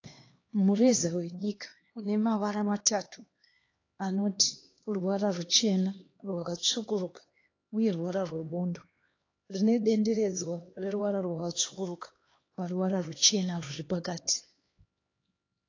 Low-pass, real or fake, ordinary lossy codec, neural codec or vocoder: 7.2 kHz; fake; AAC, 32 kbps; codec, 16 kHz, 2 kbps, X-Codec, HuBERT features, trained on LibriSpeech